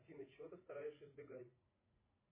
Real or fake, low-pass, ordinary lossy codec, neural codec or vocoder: fake; 3.6 kHz; MP3, 16 kbps; vocoder, 44.1 kHz, 80 mel bands, Vocos